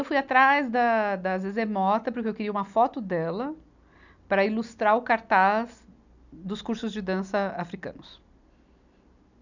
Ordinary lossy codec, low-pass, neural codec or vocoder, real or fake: none; 7.2 kHz; none; real